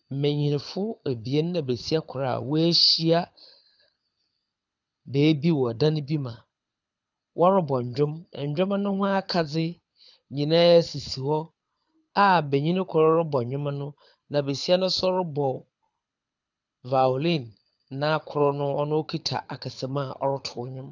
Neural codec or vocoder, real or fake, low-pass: codec, 24 kHz, 6 kbps, HILCodec; fake; 7.2 kHz